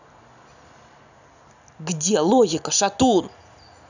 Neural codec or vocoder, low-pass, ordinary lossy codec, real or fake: none; 7.2 kHz; none; real